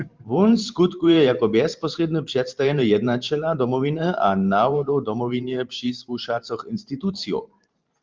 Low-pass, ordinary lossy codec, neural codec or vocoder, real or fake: 7.2 kHz; Opus, 24 kbps; vocoder, 44.1 kHz, 128 mel bands every 512 samples, BigVGAN v2; fake